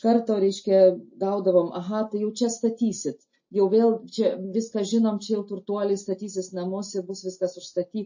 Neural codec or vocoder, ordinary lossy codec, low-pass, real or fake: none; MP3, 32 kbps; 7.2 kHz; real